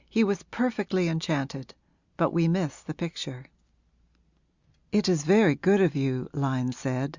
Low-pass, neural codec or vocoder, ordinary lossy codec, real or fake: 7.2 kHz; none; Opus, 64 kbps; real